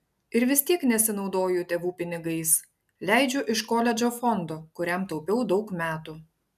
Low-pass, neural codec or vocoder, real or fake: 14.4 kHz; none; real